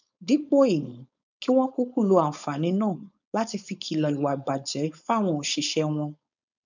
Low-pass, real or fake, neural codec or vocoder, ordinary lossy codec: 7.2 kHz; fake; codec, 16 kHz, 4.8 kbps, FACodec; none